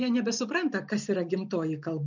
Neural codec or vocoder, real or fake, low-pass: none; real; 7.2 kHz